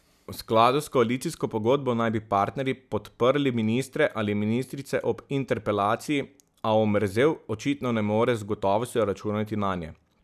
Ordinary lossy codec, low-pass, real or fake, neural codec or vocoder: none; 14.4 kHz; real; none